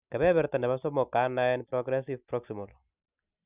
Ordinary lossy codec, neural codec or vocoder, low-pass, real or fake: Opus, 64 kbps; none; 3.6 kHz; real